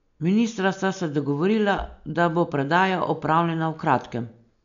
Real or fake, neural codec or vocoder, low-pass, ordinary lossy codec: real; none; 7.2 kHz; MP3, 64 kbps